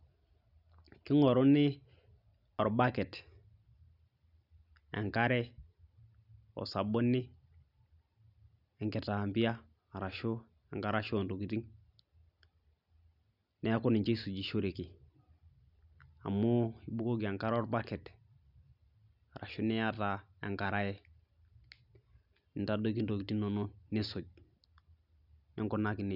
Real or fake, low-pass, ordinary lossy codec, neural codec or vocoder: real; 5.4 kHz; none; none